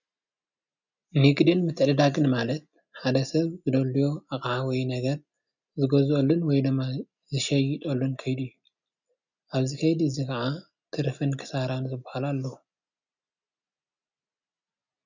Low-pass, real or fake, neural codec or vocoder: 7.2 kHz; real; none